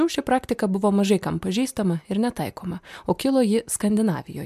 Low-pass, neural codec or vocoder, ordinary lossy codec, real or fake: 14.4 kHz; none; MP3, 96 kbps; real